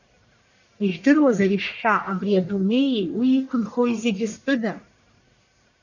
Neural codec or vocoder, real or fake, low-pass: codec, 44.1 kHz, 1.7 kbps, Pupu-Codec; fake; 7.2 kHz